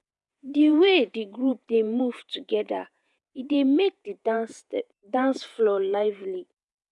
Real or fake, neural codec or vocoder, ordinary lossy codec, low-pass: fake; vocoder, 48 kHz, 128 mel bands, Vocos; none; 10.8 kHz